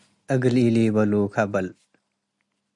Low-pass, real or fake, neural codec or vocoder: 10.8 kHz; real; none